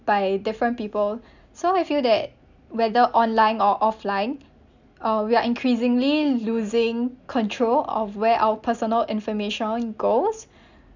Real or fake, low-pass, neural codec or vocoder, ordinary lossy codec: real; 7.2 kHz; none; none